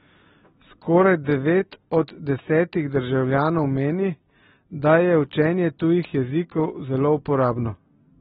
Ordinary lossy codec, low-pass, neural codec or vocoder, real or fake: AAC, 16 kbps; 7.2 kHz; none; real